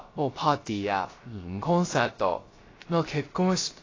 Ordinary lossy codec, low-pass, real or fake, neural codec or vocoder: AAC, 32 kbps; 7.2 kHz; fake; codec, 16 kHz, 0.3 kbps, FocalCodec